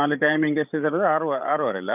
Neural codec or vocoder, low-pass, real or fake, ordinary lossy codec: codec, 16 kHz, 16 kbps, FreqCodec, larger model; 3.6 kHz; fake; none